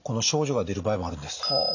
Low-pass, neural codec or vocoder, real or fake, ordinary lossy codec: 7.2 kHz; none; real; none